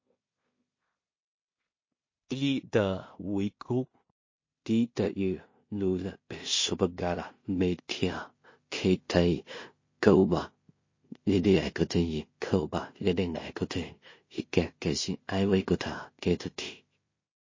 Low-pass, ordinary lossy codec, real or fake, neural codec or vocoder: 7.2 kHz; MP3, 32 kbps; fake; codec, 16 kHz in and 24 kHz out, 0.4 kbps, LongCat-Audio-Codec, two codebook decoder